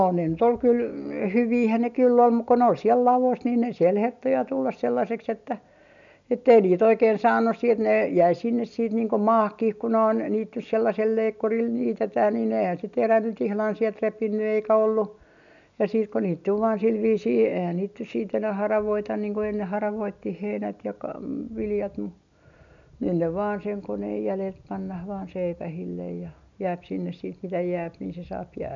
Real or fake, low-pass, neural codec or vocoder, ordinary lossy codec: real; 7.2 kHz; none; none